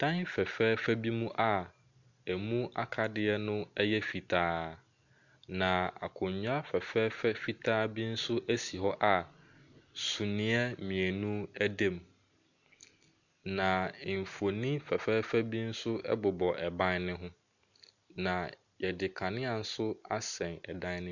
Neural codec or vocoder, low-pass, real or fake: none; 7.2 kHz; real